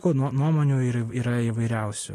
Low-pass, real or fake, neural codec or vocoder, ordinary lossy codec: 14.4 kHz; real; none; AAC, 48 kbps